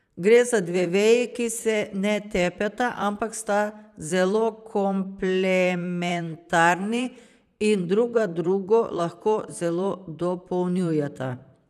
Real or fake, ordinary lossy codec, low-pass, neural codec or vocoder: fake; none; 14.4 kHz; vocoder, 44.1 kHz, 128 mel bands, Pupu-Vocoder